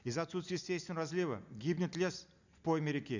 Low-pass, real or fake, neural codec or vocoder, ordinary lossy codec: 7.2 kHz; real; none; none